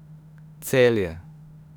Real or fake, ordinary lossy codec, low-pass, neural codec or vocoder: fake; none; 19.8 kHz; autoencoder, 48 kHz, 32 numbers a frame, DAC-VAE, trained on Japanese speech